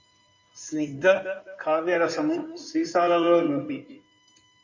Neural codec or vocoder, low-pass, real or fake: codec, 16 kHz in and 24 kHz out, 2.2 kbps, FireRedTTS-2 codec; 7.2 kHz; fake